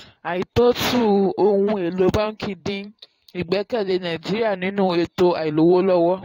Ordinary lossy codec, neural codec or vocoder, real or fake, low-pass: AAC, 48 kbps; vocoder, 44.1 kHz, 128 mel bands every 512 samples, BigVGAN v2; fake; 19.8 kHz